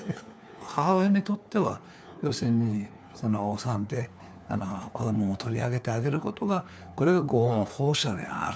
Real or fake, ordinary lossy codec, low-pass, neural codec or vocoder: fake; none; none; codec, 16 kHz, 4 kbps, FunCodec, trained on LibriTTS, 50 frames a second